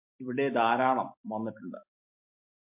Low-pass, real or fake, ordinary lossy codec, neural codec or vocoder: 3.6 kHz; fake; MP3, 32 kbps; vocoder, 44.1 kHz, 128 mel bands every 256 samples, BigVGAN v2